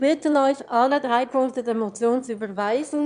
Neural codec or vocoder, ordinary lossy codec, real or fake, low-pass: autoencoder, 22.05 kHz, a latent of 192 numbers a frame, VITS, trained on one speaker; AAC, 96 kbps; fake; 9.9 kHz